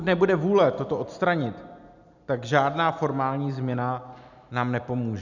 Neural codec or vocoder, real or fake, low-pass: none; real; 7.2 kHz